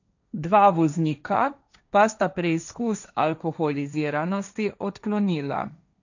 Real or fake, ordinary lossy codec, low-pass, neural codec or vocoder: fake; none; 7.2 kHz; codec, 16 kHz, 1.1 kbps, Voila-Tokenizer